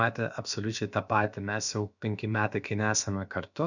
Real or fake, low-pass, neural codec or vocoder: fake; 7.2 kHz; codec, 16 kHz, about 1 kbps, DyCAST, with the encoder's durations